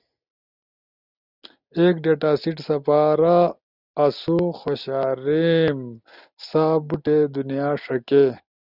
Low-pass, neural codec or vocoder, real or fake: 5.4 kHz; none; real